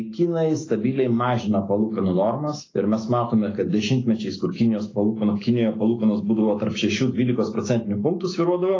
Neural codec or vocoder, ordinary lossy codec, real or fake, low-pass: autoencoder, 48 kHz, 128 numbers a frame, DAC-VAE, trained on Japanese speech; AAC, 32 kbps; fake; 7.2 kHz